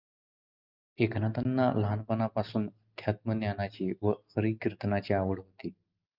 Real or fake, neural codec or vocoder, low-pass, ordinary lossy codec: real; none; 5.4 kHz; Opus, 32 kbps